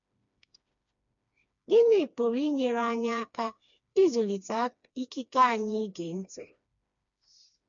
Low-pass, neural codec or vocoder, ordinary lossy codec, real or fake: 7.2 kHz; codec, 16 kHz, 2 kbps, FreqCodec, smaller model; MP3, 96 kbps; fake